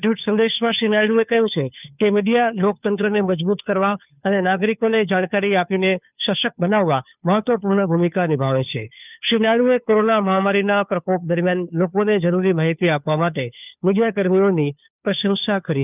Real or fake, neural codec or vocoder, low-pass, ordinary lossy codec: fake; codec, 16 kHz, 2 kbps, FunCodec, trained on Chinese and English, 25 frames a second; 3.6 kHz; none